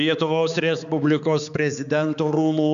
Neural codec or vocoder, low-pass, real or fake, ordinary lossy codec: codec, 16 kHz, 4 kbps, X-Codec, HuBERT features, trained on balanced general audio; 7.2 kHz; fake; MP3, 96 kbps